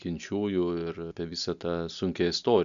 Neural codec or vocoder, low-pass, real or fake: none; 7.2 kHz; real